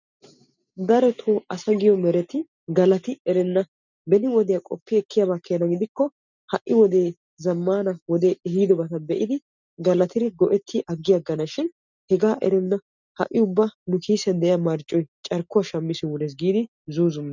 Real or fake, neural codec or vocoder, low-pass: real; none; 7.2 kHz